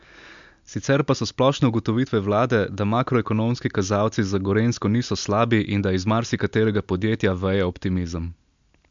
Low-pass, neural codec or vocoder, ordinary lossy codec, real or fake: 7.2 kHz; none; MP3, 64 kbps; real